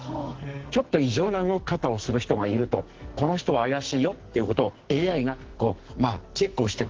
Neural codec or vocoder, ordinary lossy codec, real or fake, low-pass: codec, 44.1 kHz, 2.6 kbps, SNAC; Opus, 16 kbps; fake; 7.2 kHz